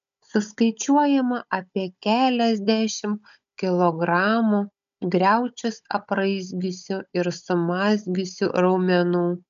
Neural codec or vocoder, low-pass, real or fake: codec, 16 kHz, 16 kbps, FunCodec, trained on Chinese and English, 50 frames a second; 7.2 kHz; fake